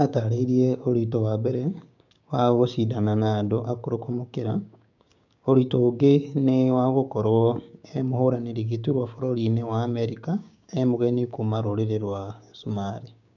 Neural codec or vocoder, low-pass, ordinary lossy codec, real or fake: codec, 16 kHz, 6 kbps, DAC; 7.2 kHz; none; fake